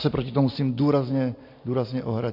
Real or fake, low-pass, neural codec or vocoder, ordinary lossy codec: real; 5.4 kHz; none; MP3, 32 kbps